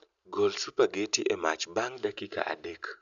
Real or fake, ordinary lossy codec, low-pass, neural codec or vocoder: real; none; 7.2 kHz; none